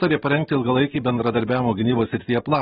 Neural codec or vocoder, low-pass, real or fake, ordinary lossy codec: none; 19.8 kHz; real; AAC, 16 kbps